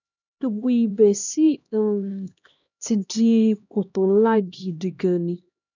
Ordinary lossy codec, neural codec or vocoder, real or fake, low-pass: none; codec, 16 kHz, 1 kbps, X-Codec, HuBERT features, trained on LibriSpeech; fake; 7.2 kHz